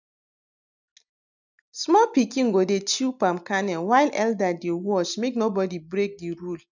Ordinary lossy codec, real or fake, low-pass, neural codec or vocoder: none; real; 7.2 kHz; none